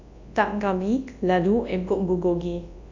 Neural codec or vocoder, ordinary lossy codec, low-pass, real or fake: codec, 24 kHz, 0.9 kbps, WavTokenizer, large speech release; AAC, 48 kbps; 7.2 kHz; fake